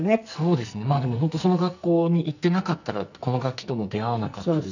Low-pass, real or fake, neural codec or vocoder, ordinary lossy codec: 7.2 kHz; fake; codec, 44.1 kHz, 2.6 kbps, SNAC; none